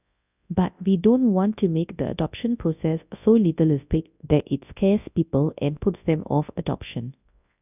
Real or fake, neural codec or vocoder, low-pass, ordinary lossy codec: fake; codec, 24 kHz, 0.9 kbps, WavTokenizer, large speech release; 3.6 kHz; none